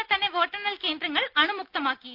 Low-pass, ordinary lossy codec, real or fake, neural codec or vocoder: 5.4 kHz; Opus, 24 kbps; real; none